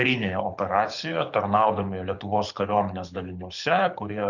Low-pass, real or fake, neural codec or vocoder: 7.2 kHz; fake; codec, 24 kHz, 6 kbps, HILCodec